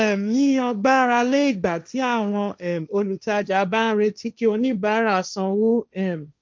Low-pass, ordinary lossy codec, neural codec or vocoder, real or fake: none; none; codec, 16 kHz, 1.1 kbps, Voila-Tokenizer; fake